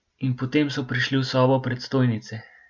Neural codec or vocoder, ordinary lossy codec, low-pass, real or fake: none; none; 7.2 kHz; real